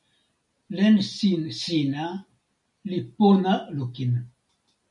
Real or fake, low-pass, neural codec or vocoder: real; 10.8 kHz; none